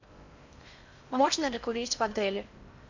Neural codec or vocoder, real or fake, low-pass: codec, 16 kHz in and 24 kHz out, 0.6 kbps, FocalCodec, streaming, 4096 codes; fake; 7.2 kHz